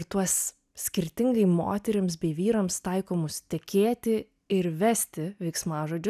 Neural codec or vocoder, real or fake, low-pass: none; real; 14.4 kHz